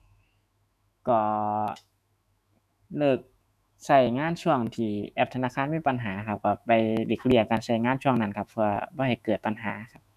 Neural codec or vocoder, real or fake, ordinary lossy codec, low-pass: autoencoder, 48 kHz, 128 numbers a frame, DAC-VAE, trained on Japanese speech; fake; none; 14.4 kHz